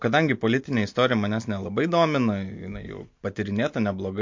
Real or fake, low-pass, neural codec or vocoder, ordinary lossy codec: real; 7.2 kHz; none; MP3, 48 kbps